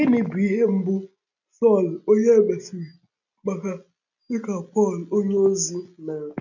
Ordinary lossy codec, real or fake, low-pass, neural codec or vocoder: none; real; 7.2 kHz; none